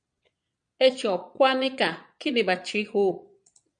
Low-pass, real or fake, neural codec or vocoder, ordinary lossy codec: 9.9 kHz; fake; vocoder, 22.05 kHz, 80 mel bands, Vocos; MP3, 64 kbps